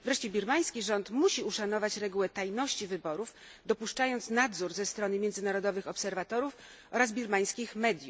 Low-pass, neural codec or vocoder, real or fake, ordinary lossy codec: none; none; real; none